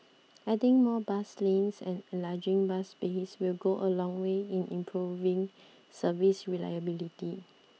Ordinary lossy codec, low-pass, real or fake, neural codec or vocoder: none; none; real; none